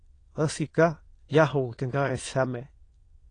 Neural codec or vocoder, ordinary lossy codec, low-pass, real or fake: autoencoder, 22.05 kHz, a latent of 192 numbers a frame, VITS, trained on many speakers; AAC, 32 kbps; 9.9 kHz; fake